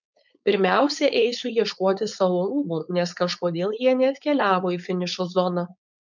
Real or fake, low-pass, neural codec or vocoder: fake; 7.2 kHz; codec, 16 kHz, 4.8 kbps, FACodec